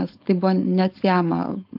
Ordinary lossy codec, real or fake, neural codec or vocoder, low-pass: AAC, 48 kbps; fake; codec, 16 kHz, 4.8 kbps, FACodec; 5.4 kHz